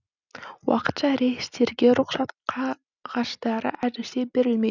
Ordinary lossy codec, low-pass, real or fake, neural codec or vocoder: none; 7.2 kHz; real; none